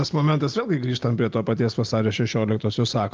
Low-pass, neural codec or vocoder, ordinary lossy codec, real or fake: 7.2 kHz; none; Opus, 24 kbps; real